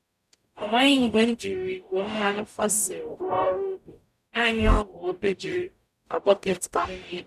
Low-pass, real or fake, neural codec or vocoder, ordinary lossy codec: 14.4 kHz; fake; codec, 44.1 kHz, 0.9 kbps, DAC; none